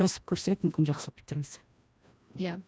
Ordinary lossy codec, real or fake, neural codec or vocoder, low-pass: none; fake; codec, 16 kHz, 1 kbps, FreqCodec, larger model; none